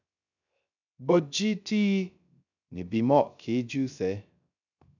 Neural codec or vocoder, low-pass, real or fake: codec, 16 kHz, 0.3 kbps, FocalCodec; 7.2 kHz; fake